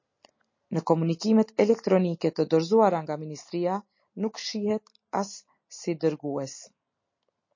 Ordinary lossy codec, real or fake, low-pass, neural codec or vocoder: MP3, 32 kbps; real; 7.2 kHz; none